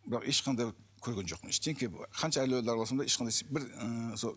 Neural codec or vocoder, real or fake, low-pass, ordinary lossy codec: none; real; none; none